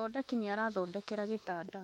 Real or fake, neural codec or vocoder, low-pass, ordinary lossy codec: fake; autoencoder, 48 kHz, 32 numbers a frame, DAC-VAE, trained on Japanese speech; 19.8 kHz; MP3, 64 kbps